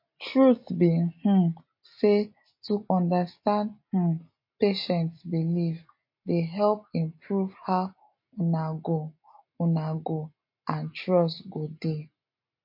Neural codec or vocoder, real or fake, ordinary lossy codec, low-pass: none; real; MP3, 32 kbps; 5.4 kHz